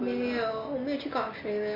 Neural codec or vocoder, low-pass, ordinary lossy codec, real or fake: none; 5.4 kHz; MP3, 24 kbps; real